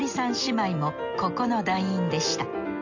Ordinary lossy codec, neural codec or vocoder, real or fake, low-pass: none; none; real; 7.2 kHz